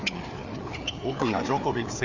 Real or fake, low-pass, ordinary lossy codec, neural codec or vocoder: fake; 7.2 kHz; none; codec, 16 kHz, 4 kbps, FreqCodec, larger model